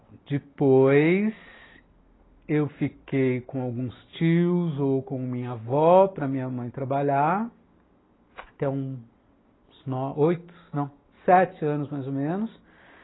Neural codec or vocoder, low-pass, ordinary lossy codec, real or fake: none; 7.2 kHz; AAC, 16 kbps; real